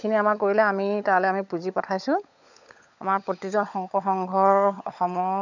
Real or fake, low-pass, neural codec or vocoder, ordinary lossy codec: fake; 7.2 kHz; codec, 16 kHz, 16 kbps, FunCodec, trained on LibriTTS, 50 frames a second; none